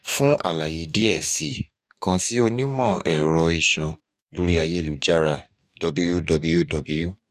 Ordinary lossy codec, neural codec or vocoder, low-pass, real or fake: none; codec, 44.1 kHz, 2.6 kbps, DAC; 14.4 kHz; fake